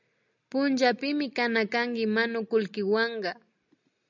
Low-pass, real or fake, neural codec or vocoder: 7.2 kHz; real; none